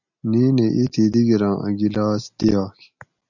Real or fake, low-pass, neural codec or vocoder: real; 7.2 kHz; none